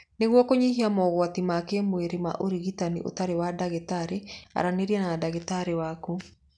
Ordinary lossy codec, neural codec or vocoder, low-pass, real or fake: none; none; 9.9 kHz; real